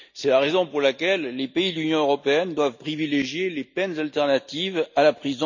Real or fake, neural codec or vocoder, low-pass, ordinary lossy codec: real; none; 7.2 kHz; none